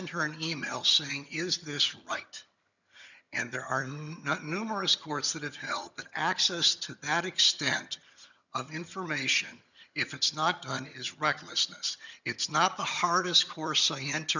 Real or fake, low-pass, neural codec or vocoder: fake; 7.2 kHz; vocoder, 22.05 kHz, 80 mel bands, HiFi-GAN